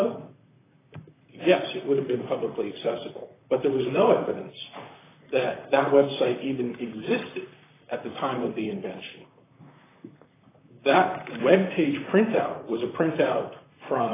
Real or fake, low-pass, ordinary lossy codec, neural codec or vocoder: fake; 3.6 kHz; AAC, 16 kbps; vocoder, 44.1 kHz, 128 mel bands, Pupu-Vocoder